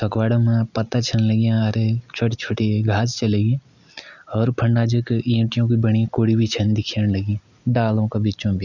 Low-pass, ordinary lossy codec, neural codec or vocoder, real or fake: 7.2 kHz; none; none; real